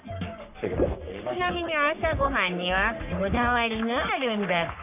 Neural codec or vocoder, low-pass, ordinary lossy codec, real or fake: codec, 44.1 kHz, 3.4 kbps, Pupu-Codec; 3.6 kHz; none; fake